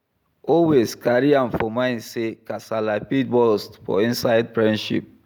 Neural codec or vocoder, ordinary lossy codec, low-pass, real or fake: none; none; none; real